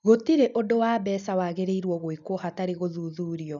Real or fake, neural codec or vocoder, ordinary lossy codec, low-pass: real; none; none; 7.2 kHz